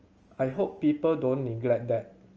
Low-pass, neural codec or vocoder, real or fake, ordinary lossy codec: 7.2 kHz; none; real; Opus, 24 kbps